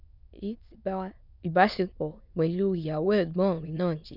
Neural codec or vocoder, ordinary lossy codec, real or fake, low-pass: autoencoder, 22.05 kHz, a latent of 192 numbers a frame, VITS, trained on many speakers; none; fake; 5.4 kHz